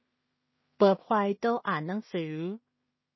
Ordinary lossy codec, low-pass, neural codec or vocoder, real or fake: MP3, 24 kbps; 7.2 kHz; codec, 16 kHz in and 24 kHz out, 0.4 kbps, LongCat-Audio-Codec, two codebook decoder; fake